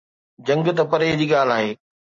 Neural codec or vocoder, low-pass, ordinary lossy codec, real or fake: none; 7.2 kHz; MP3, 32 kbps; real